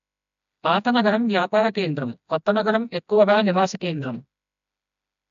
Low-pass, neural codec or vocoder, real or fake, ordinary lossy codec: 7.2 kHz; codec, 16 kHz, 1 kbps, FreqCodec, smaller model; fake; none